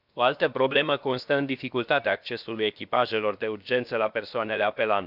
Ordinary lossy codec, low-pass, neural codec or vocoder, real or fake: none; 5.4 kHz; codec, 16 kHz, about 1 kbps, DyCAST, with the encoder's durations; fake